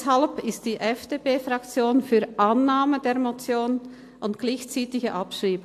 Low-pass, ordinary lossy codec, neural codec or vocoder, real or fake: 14.4 kHz; AAC, 64 kbps; none; real